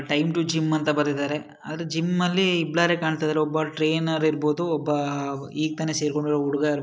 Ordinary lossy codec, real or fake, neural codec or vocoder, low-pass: none; real; none; none